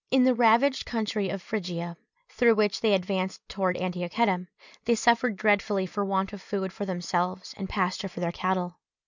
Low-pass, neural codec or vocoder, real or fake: 7.2 kHz; none; real